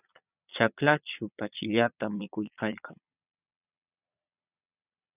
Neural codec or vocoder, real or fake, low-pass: vocoder, 22.05 kHz, 80 mel bands, Vocos; fake; 3.6 kHz